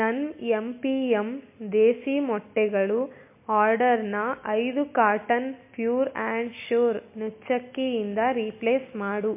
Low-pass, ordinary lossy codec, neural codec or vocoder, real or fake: 3.6 kHz; MP3, 24 kbps; none; real